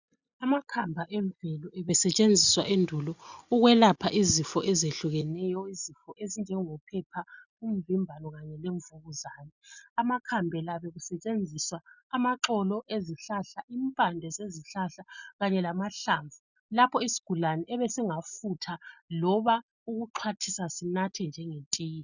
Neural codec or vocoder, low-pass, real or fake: none; 7.2 kHz; real